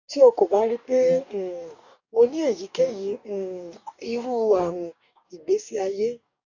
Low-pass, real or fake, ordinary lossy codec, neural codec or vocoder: 7.2 kHz; fake; none; codec, 44.1 kHz, 2.6 kbps, DAC